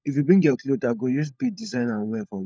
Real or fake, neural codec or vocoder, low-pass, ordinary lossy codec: fake; codec, 16 kHz, 16 kbps, FunCodec, trained on LibriTTS, 50 frames a second; none; none